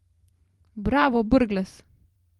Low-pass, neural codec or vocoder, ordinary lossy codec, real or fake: 19.8 kHz; vocoder, 44.1 kHz, 128 mel bands every 512 samples, BigVGAN v2; Opus, 24 kbps; fake